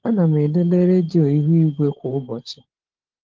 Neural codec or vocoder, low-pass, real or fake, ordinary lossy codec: codec, 16 kHz, 16 kbps, FunCodec, trained on LibriTTS, 50 frames a second; 7.2 kHz; fake; Opus, 16 kbps